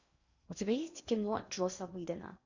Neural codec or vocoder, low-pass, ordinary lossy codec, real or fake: codec, 16 kHz in and 24 kHz out, 0.8 kbps, FocalCodec, streaming, 65536 codes; 7.2 kHz; Opus, 64 kbps; fake